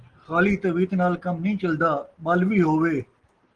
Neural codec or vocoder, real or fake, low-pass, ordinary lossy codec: none; real; 9.9 kHz; Opus, 16 kbps